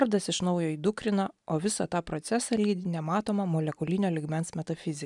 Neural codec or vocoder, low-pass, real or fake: none; 10.8 kHz; real